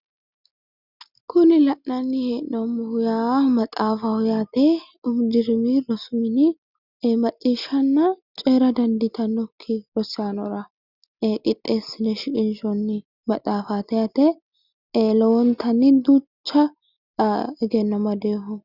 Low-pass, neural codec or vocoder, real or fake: 5.4 kHz; none; real